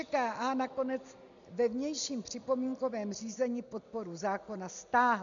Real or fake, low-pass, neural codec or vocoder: real; 7.2 kHz; none